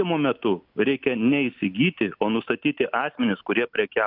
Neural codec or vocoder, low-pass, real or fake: none; 5.4 kHz; real